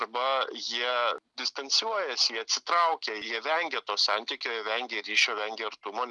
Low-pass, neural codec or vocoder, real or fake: 10.8 kHz; none; real